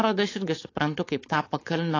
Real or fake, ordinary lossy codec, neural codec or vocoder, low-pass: fake; AAC, 32 kbps; codec, 16 kHz, 4.8 kbps, FACodec; 7.2 kHz